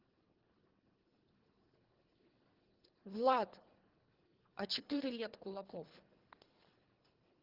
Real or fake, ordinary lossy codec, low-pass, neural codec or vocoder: fake; Opus, 16 kbps; 5.4 kHz; codec, 24 kHz, 3 kbps, HILCodec